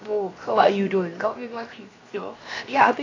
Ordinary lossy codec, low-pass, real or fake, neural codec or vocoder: AAC, 32 kbps; 7.2 kHz; fake; codec, 16 kHz, 0.7 kbps, FocalCodec